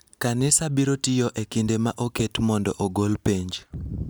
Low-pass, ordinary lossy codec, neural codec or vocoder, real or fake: none; none; none; real